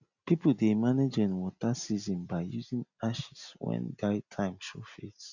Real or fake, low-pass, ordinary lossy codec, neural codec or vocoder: real; 7.2 kHz; none; none